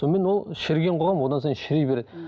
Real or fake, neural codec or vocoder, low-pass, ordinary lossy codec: real; none; none; none